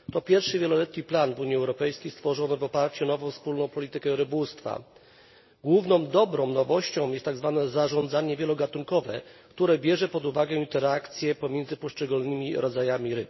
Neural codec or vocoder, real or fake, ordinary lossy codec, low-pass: none; real; MP3, 24 kbps; 7.2 kHz